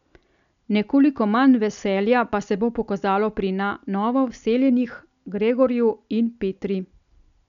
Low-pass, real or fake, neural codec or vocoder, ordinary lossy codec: 7.2 kHz; real; none; none